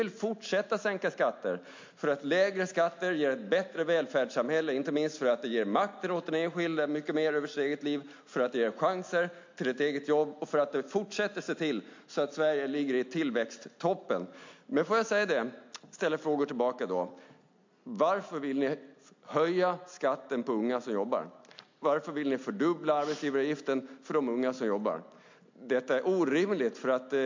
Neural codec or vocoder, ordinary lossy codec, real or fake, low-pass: none; MP3, 48 kbps; real; 7.2 kHz